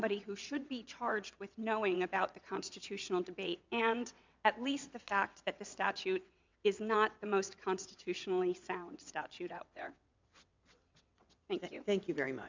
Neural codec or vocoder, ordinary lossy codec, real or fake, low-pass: vocoder, 22.05 kHz, 80 mel bands, WaveNeXt; MP3, 64 kbps; fake; 7.2 kHz